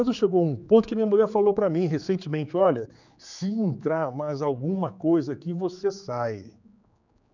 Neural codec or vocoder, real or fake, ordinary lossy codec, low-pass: codec, 16 kHz, 4 kbps, X-Codec, HuBERT features, trained on general audio; fake; none; 7.2 kHz